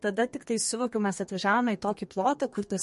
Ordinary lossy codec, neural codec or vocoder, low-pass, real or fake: MP3, 48 kbps; codec, 32 kHz, 1.9 kbps, SNAC; 14.4 kHz; fake